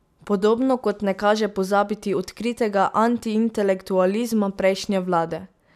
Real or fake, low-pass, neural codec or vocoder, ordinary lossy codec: real; 14.4 kHz; none; none